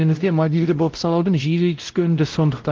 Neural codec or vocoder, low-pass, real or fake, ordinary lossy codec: codec, 16 kHz, 0.5 kbps, X-Codec, WavLM features, trained on Multilingual LibriSpeech; 7.2 kHz; fake; Opus, 16 kbps